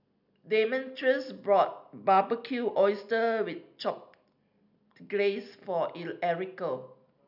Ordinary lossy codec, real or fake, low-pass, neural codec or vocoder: none; real; 5.4 kHz; none